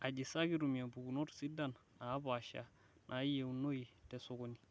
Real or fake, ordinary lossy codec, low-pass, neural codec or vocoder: real; none; none; none